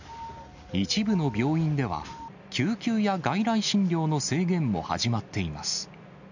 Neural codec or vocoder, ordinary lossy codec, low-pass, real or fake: none; none; 7.2 kHz; real